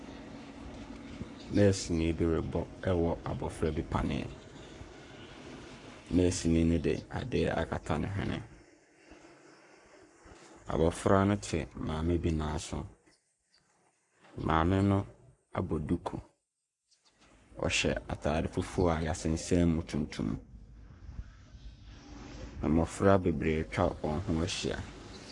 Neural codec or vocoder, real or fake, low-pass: codec, 44.1 kHz, 7.8 kbps, Pupu-Codec; fake; 10.8 kHz